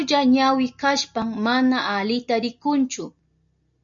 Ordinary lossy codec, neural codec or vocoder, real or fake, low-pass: MP3, 64 kbps; none; real; 7.2 kHz